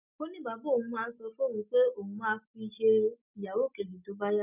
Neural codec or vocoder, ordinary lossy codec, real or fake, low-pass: none; none; real; 3.6 kHz